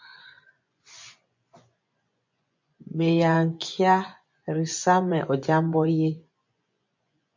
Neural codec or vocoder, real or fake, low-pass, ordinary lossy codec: vocoder, 24 kHz, 100 mel bands, Vocos; fake; 7.2 kHz; MP3, 48 kbps